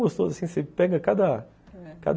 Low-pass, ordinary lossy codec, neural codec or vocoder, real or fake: none; none; none; real